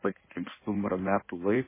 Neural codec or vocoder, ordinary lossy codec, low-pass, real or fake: codec, 16 kHz in and 24 kHz out, 1.1 kbps, FireRedTTS-2 codec; MP3, 16 kbps; 3.6 kHz; fake